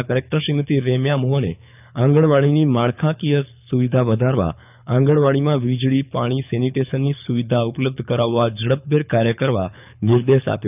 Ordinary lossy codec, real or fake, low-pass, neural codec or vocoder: none; fake; 3.6 kHz; codec, 24 kHz, 6 kbps, HILCodec